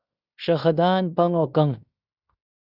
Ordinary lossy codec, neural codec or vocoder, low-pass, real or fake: Opus, 64 kbps; codec, 16 kHz in and 24 kHz out, 0.9 kbps, LongCat-Audio-Codec, fine tuned four codebook decoder; 5.4 kHz; fake